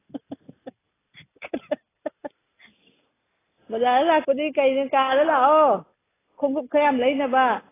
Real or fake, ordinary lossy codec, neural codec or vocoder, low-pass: real; AAC, 16 kbps; none; 3.6 kHz